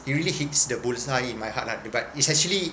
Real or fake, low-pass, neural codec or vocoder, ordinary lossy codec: real; none; none; none